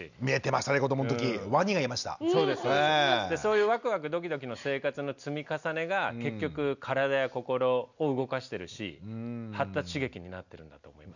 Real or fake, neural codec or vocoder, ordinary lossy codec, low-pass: real; none; none; 7.2 kHz